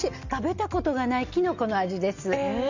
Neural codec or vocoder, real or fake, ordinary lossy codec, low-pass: none; real; Opus, 64 kbps; 7.2 kHz